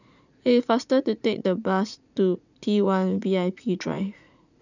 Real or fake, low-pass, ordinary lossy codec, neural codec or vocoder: fake; 7.2 kHz; none; vocoder, 44.1 kHz, 80 mel bands, Vocos